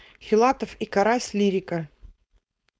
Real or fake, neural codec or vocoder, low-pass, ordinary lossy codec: fake; codec, 16 kHz, 4.8 kbps, FACodec; none; none